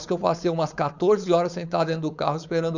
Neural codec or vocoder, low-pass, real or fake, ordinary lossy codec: codec, 16 kHz, 4.8 kbps, FACodec; 7.2 kHz; fake; none